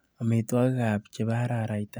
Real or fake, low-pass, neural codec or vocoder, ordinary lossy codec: real; none; none; none